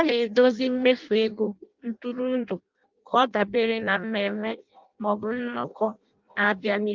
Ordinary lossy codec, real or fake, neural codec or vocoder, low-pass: Opus, 24 kbps; fake; codec, 16 kHz in and 24 kHz out, 0.6 kbps, FireRedTTS-2 codec; 7.2 kHz